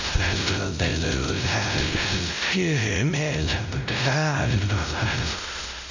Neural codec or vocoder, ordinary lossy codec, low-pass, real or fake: codec, 16 kHz, 0.5 kbps, X-Codec, WavLM features, trained on Multilingual LibriSpeech; none; 7.2 kHz; fake